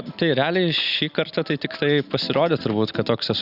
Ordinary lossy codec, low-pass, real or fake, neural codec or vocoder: Opus, 64 kbps; 5.4 kHz; real; none